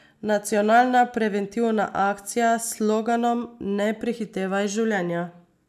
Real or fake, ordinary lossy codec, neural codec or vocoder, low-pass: real; none; none; 14.4 kHz